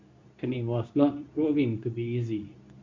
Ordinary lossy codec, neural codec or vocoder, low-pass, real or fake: MP3, 48 kbps; codec, 24 kHz, 0.9 kbps, WavTokenizer, medium speech release version 2; 7.2 kHz; fake